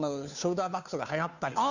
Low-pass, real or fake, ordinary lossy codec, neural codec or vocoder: 7.2 kHz; fake; none; codec, 16 kHz, 2 kbps, FunCodec, trained on Chinese and English, 25 frames a second